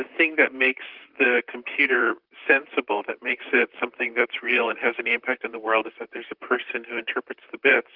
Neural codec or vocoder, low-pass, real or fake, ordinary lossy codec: vocoder, 44.1 kHz, 128 mel bands, Pupu-Vocoder; 5.4 kHz; fake; Opus, 24 kbps